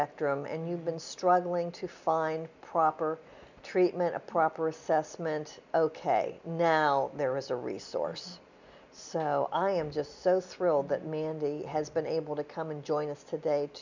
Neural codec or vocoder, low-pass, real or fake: none; 7.2 kHz; real